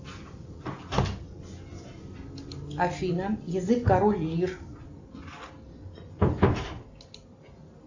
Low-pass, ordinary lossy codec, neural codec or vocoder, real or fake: 7.2 kHz; AAC, 48 kbps; none; real